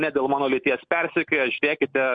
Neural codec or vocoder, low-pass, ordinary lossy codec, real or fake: none; 9.9 kHz; MP3, 64 kbps; real